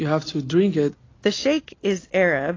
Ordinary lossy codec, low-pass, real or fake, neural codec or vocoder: AAC, 32 kbps; 7.2 kHz; real; none